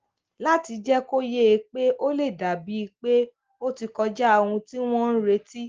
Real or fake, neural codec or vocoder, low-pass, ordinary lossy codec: real; none; 7.2 kHz; Opus, 16 kbps